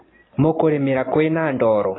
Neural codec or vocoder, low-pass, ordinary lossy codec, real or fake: none; 7.2 kHz; AAC, 16 kbps; real